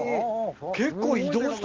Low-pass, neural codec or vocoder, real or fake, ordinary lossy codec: 7.2 kHz; none; real; Opus, 16 kbps